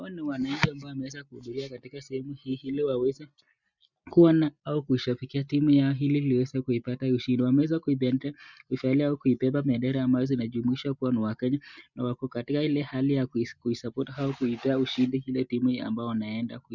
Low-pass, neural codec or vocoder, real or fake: 7.2 kHz; none; real